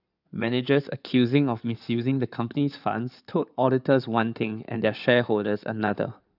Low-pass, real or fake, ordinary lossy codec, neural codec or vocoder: 5.4 kHz; fake; none; codec, 16 kHz in and 24 kHz out, 2.2 kbps, FireRedTTS-2 codec